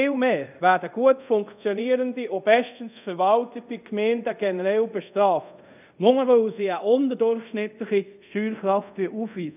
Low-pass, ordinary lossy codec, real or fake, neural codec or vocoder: 3.6 kHz; none; fake; codec, 24 kHz, 0.5 kbps, DualCodec